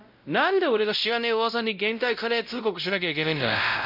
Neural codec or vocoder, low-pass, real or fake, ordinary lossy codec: codec, 16 kHz, 0.5 kbps, X-Codec, WavLM features, trained on Multilingual LibriSpeech; 5.4 kHz; fake; none